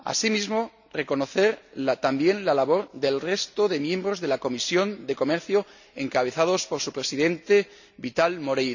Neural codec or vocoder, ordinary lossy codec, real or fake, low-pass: none; none; real; 7.2 kHz